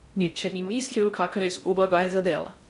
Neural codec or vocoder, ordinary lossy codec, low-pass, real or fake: codec, 16 kHz in and 24 kHz out, 0.6 kbps, FocalCodec, streaming, 4096 codes; none; 10.8 kHz; fake